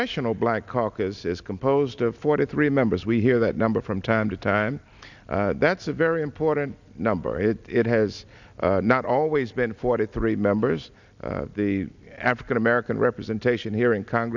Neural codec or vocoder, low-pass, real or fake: none; 7.2 kHz; real